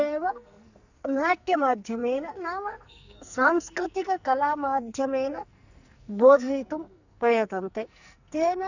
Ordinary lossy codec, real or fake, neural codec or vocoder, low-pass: none; fake; codec, 44.1 kHz, 2.6 kbps, SNAC; 7.2 kHz